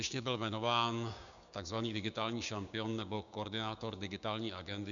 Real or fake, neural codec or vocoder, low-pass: fake; codec, 16 kHz, 6 kbps, DAC; 7.2 kHz